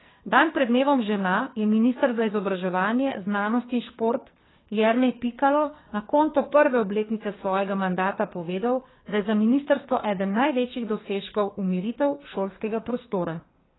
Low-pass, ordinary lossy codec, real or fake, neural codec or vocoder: 7.2 kHz; AAC, 16 kbps; fake; codec, 44.1 kHz, 2.6 kbps, SNAC